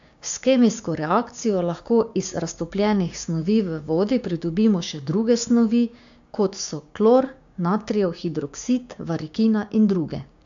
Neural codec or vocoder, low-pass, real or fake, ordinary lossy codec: codec, 16 kHz, 6 kbps, DAC; 7.2 kHz; fake; AAC, 64 kbps